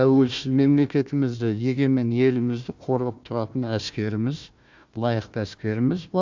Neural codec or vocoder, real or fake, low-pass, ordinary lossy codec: codec, 16 kHz, 1 kbps, FunCodec, trained on Chinese and English, 50 frames a second; fake; 7.2 kHz; MP3, 64 kbps